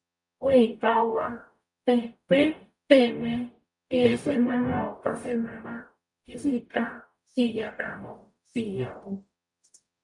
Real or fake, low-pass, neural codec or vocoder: fake; 10.8 kHz; codec, 44.1 kHz, 0.9 kbps, DAC